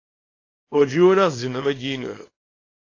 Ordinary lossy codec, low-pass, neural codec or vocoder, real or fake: AAC, 32 kbps; 7.2 kHz; codec, 24 kHz, 0.9 kbps, WavTokenizer, small release; fake